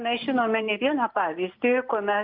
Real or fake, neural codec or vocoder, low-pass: real; none; 5.4 kHz